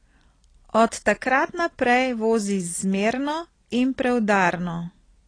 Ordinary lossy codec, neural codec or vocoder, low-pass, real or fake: AAC, 32 kbps; none; 9.9 kHz; real